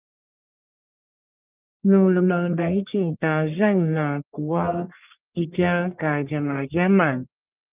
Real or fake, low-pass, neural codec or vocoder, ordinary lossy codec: fake; 3.6 kHz; codec, 44.1 kHz, 1.7 kbps, Pupu-Codec; Opus, 24 kbps